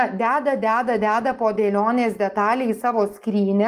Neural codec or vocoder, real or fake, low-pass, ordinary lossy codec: none; real; 14.4 kHz; Opus, 24 kbps